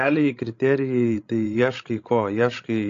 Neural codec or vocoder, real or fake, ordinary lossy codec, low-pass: codec, 16 kHz, 16 kbps, FreqCodec, larger model; fake; AAC, 48 kbps; 7.2 kHz